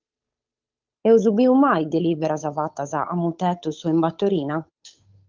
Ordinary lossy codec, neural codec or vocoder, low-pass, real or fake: Opus, 24 kbps; codec, 16 kHz, 8 kbps, FunCodec, trained on Chinese and English, 25 frames a second; 7.2 kHz; fake